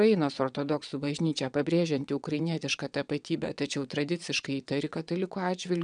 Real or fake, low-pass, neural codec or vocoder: fake; 9.9 kHz; vocoder, 22.05 kHz, 80 mel bands, WaveNeXt